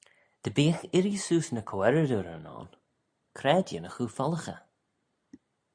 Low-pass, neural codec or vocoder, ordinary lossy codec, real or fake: 9.9 kHz; none; AAC, 64 kbps; real